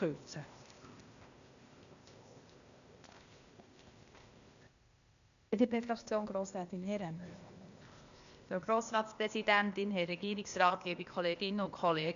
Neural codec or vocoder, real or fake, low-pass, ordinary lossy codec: codec, 16 kHz, 0.8 kbps, ZipCodec; fake; 7.2 kHz; none